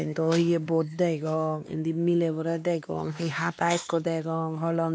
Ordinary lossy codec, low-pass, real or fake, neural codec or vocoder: none; none; fake; codec, 16 kHz, 2 kbps, X-Codec, WavLM features, trained on Multilingual LibriSpeech